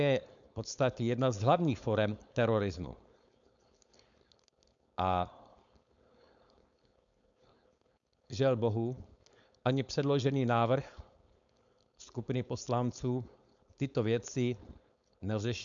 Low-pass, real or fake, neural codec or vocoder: 7.2 kHz; fake; codec, 16 kHz, 4.8 kbps, FACodec